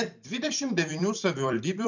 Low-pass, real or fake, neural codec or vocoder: 7.2 kHz; fake; vocoder, 44.1 kHz, 128 mel bands every 256 samples, BigVGAN v2